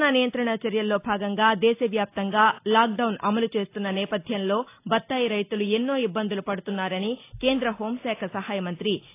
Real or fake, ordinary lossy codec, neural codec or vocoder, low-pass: real; AAC, 24 kbps; none; 3.6 kHz